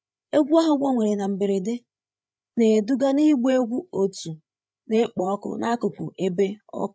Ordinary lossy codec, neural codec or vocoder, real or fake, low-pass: none; codec, 16 kHz, 8 kbps, FreqCodec, larger model; fake; none